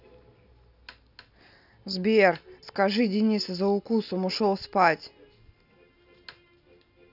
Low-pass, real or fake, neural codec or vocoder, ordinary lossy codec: 5.4 kHz; real; none; AAC, 48 kbps